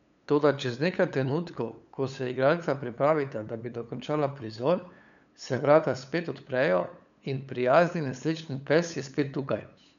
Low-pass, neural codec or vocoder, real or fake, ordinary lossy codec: 7.2 kHz; codec, 16 kHz, 8 kbps, FunCodec, trained on LibriTTS, 25 frames a second; fake; none